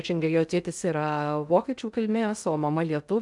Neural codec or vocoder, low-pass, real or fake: codec, 16 kHz in and 24 kHz out, 0.6 kbps, FocalCodec, streaming, 2048 codes; 10.8 kHz; fake